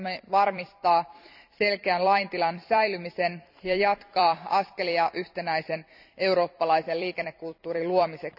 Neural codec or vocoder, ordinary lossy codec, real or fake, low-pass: vocoder, 44.1 kHz, 128 mel bands every 256 samples, BigVGAN v2; none; fake; 5.4 kHz